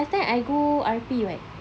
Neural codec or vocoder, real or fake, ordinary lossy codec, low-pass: none; real; none; none